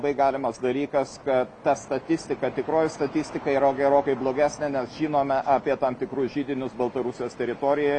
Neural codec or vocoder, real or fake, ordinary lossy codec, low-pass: none; real; Opus, 64 kbps; 10.8 kHz